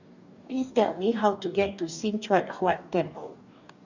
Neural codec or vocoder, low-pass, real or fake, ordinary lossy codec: codec, 44.1 kHz, 2.6 kbps, DAC; 7.2 kHz; fake; none